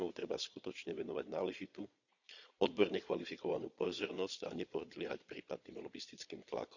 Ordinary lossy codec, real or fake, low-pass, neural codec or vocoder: none; fake; 7.2 kHz; vocoder, 22.05 kHz, 80 mel bands, Vocos